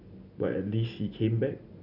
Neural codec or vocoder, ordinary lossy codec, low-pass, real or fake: none; none; 5.4 kHz; real